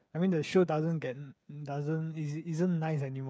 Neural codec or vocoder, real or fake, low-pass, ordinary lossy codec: codec, 16 kHz, 8 kbps, FreqCodec, smaller model; fake; none; none